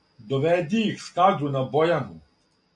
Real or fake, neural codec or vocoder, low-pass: real; none; 10.8 kHz